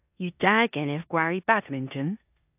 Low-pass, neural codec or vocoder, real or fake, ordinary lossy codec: 3.6 kHz; codec, 16 kHz in and 24 kHz out, 0.9 kbps, LongCat-Audio-Codec, four codebook decoder; fake; AAC, 32 kbps